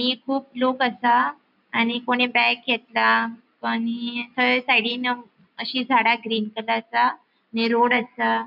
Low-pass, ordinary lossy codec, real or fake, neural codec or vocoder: 5.4 kHz; none; real; none